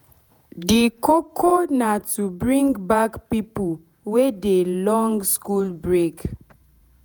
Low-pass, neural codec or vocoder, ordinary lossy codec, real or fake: none; vocoder, 48 kHz, 128 mel bands, Vocos; none; fake